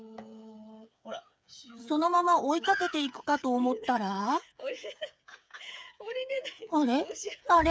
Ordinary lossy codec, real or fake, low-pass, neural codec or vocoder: none; fake; none; codec, 16 kHz, 8 kbps, FreqCodec, smaller model